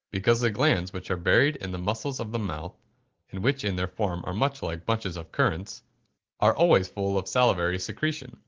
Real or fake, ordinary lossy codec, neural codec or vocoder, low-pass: real; Opus, 16 kbps; none; 7.2 kHz